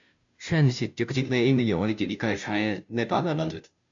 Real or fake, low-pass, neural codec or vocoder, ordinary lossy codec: fake; 7.2 kHz; codec, 16 kHz, 0.5 kbps, FunCodec, trained on Chinese and English, 25 frames a second; MP3, 64 kbps